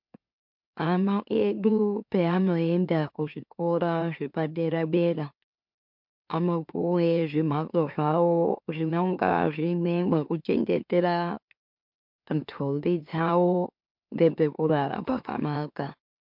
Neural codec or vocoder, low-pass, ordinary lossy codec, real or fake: autoencoder, 44.1 kHz, a latent of 192 numbers a frame, MeloTTS; 5.4 kHz; MP3, 48 kbps; fake